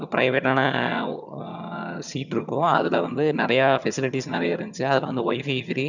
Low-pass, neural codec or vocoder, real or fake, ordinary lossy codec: 7.2 kHz; vocoder, 22.05 kHz, 80 mel bands, HiFi-GAN; fake; none